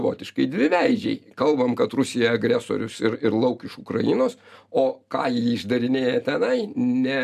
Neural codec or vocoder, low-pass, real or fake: none; 14.4 kHz; real